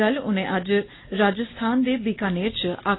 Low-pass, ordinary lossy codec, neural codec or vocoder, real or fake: 7.2 kHz; AAC, 16 kbps; none; real